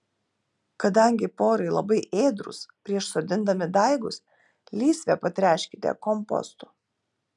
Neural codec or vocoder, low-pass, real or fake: none; 10.8 kHz; real